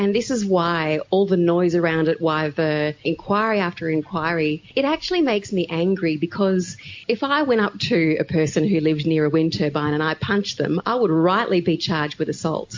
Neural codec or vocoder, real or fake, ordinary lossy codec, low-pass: none; real; MP3, 48 kbps; 7.2 kHz